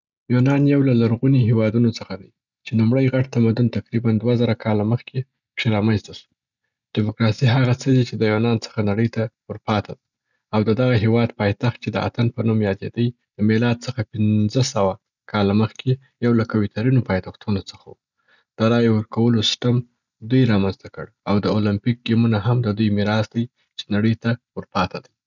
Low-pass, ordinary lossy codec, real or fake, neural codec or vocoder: 7.2 kHz; none; real; none